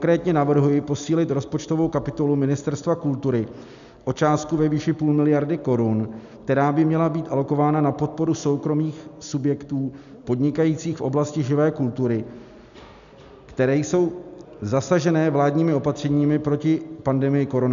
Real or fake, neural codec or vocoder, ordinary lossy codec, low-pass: real; none; MP3, 96 kbps; 7.2 kHz